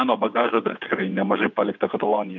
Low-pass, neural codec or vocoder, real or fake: 7.2 kHz; vocoder, 22.05 kHz, 80 mel bands, WaveNeXt; fake